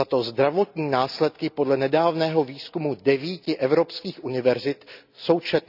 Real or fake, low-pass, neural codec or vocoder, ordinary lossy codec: real; 5.4 kHz; none; none